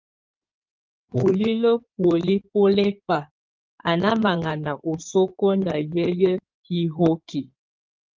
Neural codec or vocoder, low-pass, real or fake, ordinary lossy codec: codec, 16 kHz in and 24 kHz out, 2.2 kbps, FireRedTTS-2 codec; 7.2 kHz; fake; Opus, 24 kbps